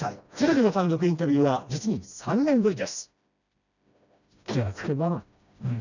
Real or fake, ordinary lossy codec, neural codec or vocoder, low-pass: fake; none; codec, 16 kHz, 1 kbps, FreqCodec, smaller model; 7.2 kHz